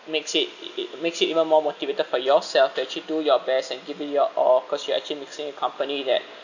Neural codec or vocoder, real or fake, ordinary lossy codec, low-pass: none; real; none; 7.2 kHz